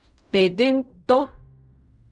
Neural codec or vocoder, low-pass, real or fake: codec, 16 kHz in and 24 kHz out, 0.4 kbps, LongCat-Audio-Codec, fine tuned four codebook decoder; 10.8 kHz; fake